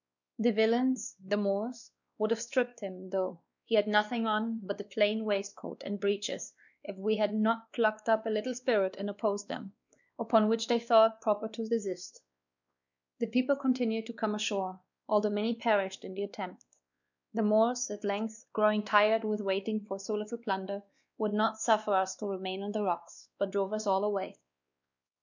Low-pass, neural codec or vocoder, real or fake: 7.2 kHz; codec, 16 kHz, 2 kbps, X-Codec, WavLM features, trained on Multilingual LibriSpeech; fake